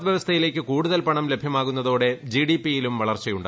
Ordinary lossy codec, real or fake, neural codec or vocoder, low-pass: none; real; none; none